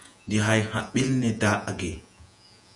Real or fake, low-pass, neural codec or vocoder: fake; 10.8 kHz; vocoder, 48 kHz, 128 mel bands, Vocos